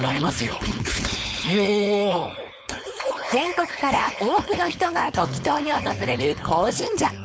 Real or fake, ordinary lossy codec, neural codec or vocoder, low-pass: fake; none; codec, 16 kHz, 4.8 kbps, FACodec; none